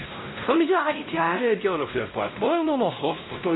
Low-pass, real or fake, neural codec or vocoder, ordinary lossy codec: 7.2 kHz; fake; codec, 16 kHz, 0.5 kbps, X-Codec, WavLM features, trained on Multilingual LibriSpeech; AAC, 16 kbps